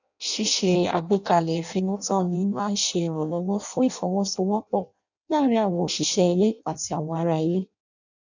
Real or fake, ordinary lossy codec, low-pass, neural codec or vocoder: fake; none; 7.2 kHz; codec, 16 kHz in and 24 kHz out, 0.6 kbps, FireRedTTS-2 codec